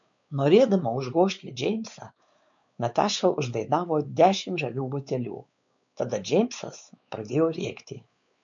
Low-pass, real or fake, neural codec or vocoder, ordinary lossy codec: 7.2 kHz; fake; codec, 16 kHz, 4 kbps, X-Codec, WavLM features, trained on Multilingual LibriSpeech; MP3, 64 kbps